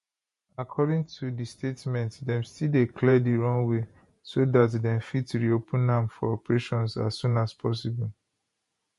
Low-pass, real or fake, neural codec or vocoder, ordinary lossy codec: 14.4 kHz; fake; vocoder, 48 kHz, 128 mel bands, Vocos; MP3, 48 kbps